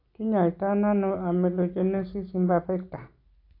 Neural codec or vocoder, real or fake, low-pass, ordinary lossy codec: none; real; 5.4 kHz; AAC, 32 kbps